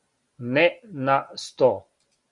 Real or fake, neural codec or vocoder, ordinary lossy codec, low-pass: real; none; MP3, 96 kbps; 10.8 kHz